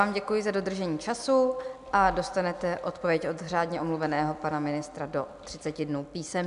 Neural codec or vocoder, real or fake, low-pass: none; real; 10.8 kHz